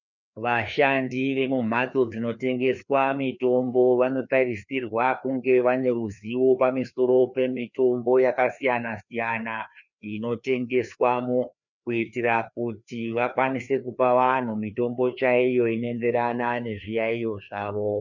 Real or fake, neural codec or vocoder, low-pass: fake; codec, 16 kHz, 2 kbps, FreqCodec, larger model; 7.2 kHz